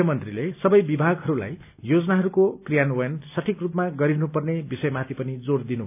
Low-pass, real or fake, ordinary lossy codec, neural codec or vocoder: 3.6 kHz; real; none; none